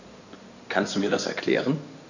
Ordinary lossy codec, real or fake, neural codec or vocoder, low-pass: none; fake; codec, 16 kHz in and 24 kHz out, 2.2 kbps, FireRedTTS-2 codec; 7.2 kHz